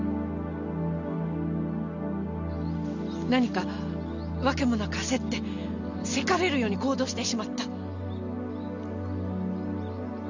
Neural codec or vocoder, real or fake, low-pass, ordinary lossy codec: none; real; 7.2 kHz; none